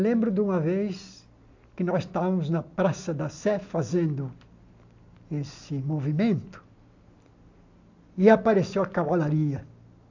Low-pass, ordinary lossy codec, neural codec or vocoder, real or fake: 7.2 kHz; AAC, 48 kbps; none; real